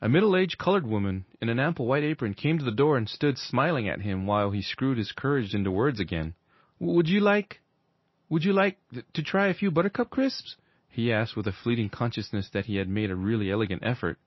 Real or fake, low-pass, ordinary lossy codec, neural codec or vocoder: real; 7.2 kHz; MP3, 24 kbps; none